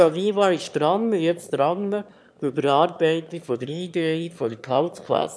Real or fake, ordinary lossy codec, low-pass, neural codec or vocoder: fake; none; none; autoencoder, 22.05 kHz, a latent of 192 numbers a frame, VITS, trained on one speaker